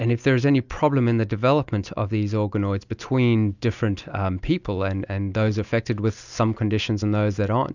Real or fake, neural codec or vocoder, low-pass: real; none; 7.2 kHz